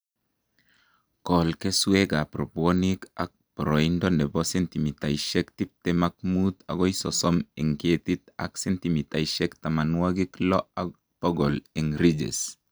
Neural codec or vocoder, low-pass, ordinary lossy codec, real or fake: none; none; none; real